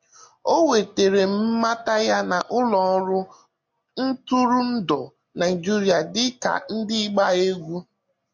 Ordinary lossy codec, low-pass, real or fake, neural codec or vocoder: MP3, 48 kbps; 7.2 kHz; real; none